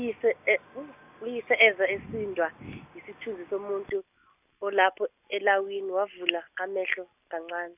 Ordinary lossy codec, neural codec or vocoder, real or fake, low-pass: none; none; real; 3.6 kHz